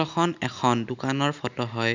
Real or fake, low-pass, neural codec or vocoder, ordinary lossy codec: real; 7.2 kHz; none; none